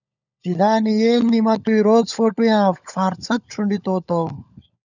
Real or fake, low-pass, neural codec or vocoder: fake; 7.2 kHz; codec, 16 kHz, 16 kbps, FunCodec, trained on LibriTTS, 50 frames a second